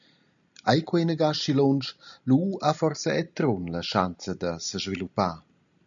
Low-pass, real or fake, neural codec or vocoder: 7.2 kHz; real; none